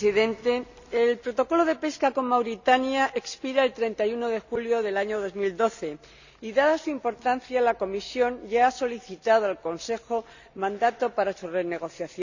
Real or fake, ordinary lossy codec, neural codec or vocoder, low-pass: real; none; none; 7.2 kHz